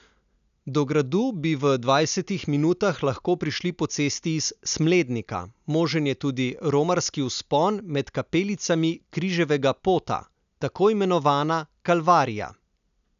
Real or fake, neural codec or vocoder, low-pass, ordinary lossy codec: real; none; 7.2 kHz; none